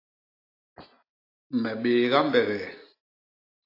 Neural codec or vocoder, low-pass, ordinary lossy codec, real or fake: none; 5.4 kHz; AAC, 32 kbps; real